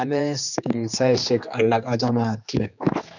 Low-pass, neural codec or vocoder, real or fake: 7.2 kHz; codec, 16 kHz, 2 kbps, X-Codec, HuBERT features, trained on general audio; fake